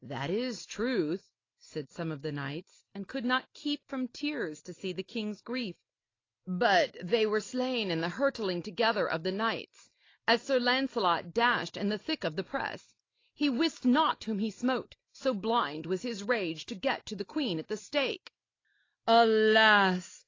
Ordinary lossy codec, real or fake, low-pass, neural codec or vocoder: AAC, 32 kbps; real; 7.2 kHz; none